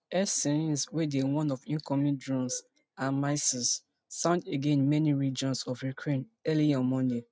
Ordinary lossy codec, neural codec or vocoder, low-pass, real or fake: none; none; none; real